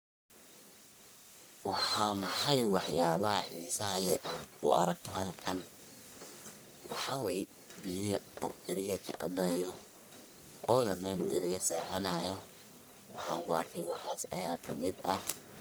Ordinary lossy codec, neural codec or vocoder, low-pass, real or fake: none; codec, 44.1 kHz, 1.7 kbps, Pupu-Codec; none; fake